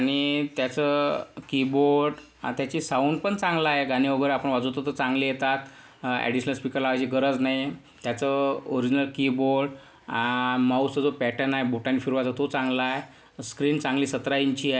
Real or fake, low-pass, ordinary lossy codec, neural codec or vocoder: real; none; none; none